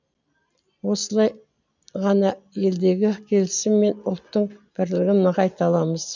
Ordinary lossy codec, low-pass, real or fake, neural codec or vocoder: none; 7.2 kHz; real; none